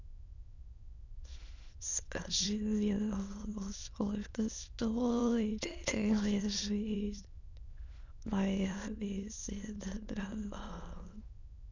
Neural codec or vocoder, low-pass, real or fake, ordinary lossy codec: autoencoder, 22.05 kHz, a latent of 192 numbers a frame, VITS, trained on many speakers; 7.2 kHz; fake; none